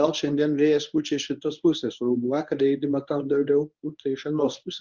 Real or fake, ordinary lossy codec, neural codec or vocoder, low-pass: fake; Opus, 32 kbps; codec, 24 kHz, 0.9 kbps, WavTokenizer, medium speech release version 1; 7.2 kHz